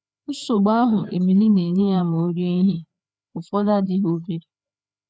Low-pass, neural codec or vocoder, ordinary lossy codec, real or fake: none; codec, 16 kHz, 4 kbps, FreqCodec, larger model; none; fake